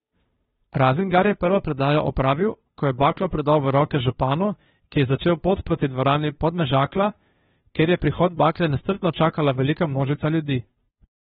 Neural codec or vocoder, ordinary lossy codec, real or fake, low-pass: codec, 16 kHz, 2 kbps, FunCodec, trained on Chinese and English, 25 frames a second; AAC, 16 kbps; fake; 7.2 kHz